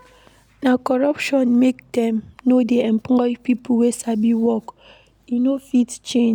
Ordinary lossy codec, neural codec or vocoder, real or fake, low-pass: none; none; real; 19.8 kHz